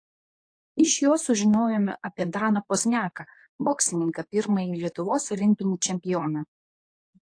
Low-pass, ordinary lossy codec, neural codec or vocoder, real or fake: 9.9 kHz; AAC, 48 kbps; codec, 24 kHz, 0.9 kbps, WavTokenizer, medium speech release version 2; fake